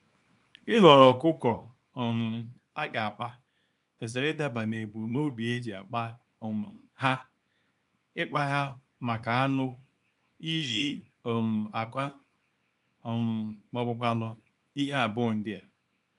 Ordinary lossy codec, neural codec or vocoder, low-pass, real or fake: none; codec, 24 kHz, 0.9 kbps, WavTokenizer, small release; 10.8 kHz; fake